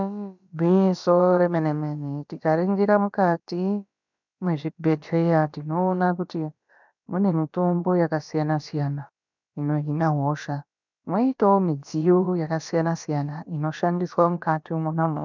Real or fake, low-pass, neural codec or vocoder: fake; 7.2 kHz; codec, 16 kHz, about 1 kbps, DyCAST, with the encoder's durations